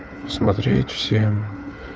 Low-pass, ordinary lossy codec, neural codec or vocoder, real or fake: none; none; codec, 16 kHz, 4 kbps, FunCodec, trained on Chinese and English, 50 frames a second; fake